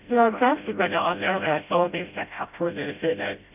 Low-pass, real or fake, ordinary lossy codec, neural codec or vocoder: 3.6 kHz; fake; none; codec, 16 kHz, 0.5 kbps, FreqCodec, smaller model